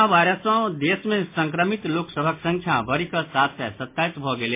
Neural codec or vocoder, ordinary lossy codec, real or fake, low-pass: none; MP3, 24 kbps; real; 3.6 kHz